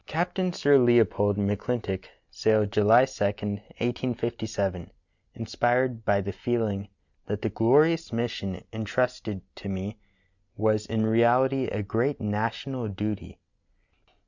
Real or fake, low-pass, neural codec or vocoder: real; 7.2 kHz; none